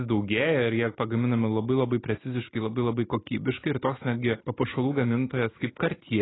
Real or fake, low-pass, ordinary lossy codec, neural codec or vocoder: real; 7.2 kHz; AAC, 16 kbps; none